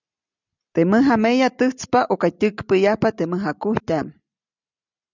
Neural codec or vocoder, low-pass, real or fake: none; 7.2 kHz; real